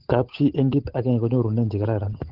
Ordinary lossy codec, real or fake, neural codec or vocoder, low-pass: Opus, 16 kbps; fake; codec, 16 kHz, 8 kbps, FunCodec, trained on Chinese and English, 25 frames a second; 5.4 kHz